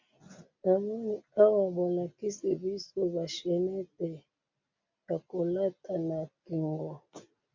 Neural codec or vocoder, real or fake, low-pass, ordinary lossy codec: none; real; 7.2 kHz; MP3, 64 kbps